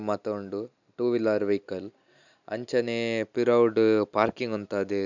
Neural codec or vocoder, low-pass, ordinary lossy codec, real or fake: none; 7.2 kHz; none; real